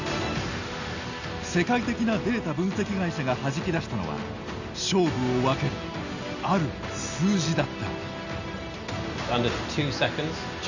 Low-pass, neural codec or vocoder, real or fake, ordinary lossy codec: 7.2 kHz; none; real; none